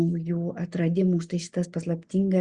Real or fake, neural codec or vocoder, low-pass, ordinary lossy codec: real; none; 9.9 kHz; Opus, 64 kbps